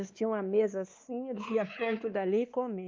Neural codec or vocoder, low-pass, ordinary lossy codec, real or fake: codec, 16 kHz, 2 kbps, X-Codec, WavLM features, trained on Multilingual LibriSpeech; 7.2 kHz; Opus, 32 kbps; fake